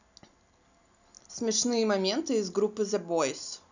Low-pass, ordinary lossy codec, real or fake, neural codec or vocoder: 7.2 kHz; none; real; none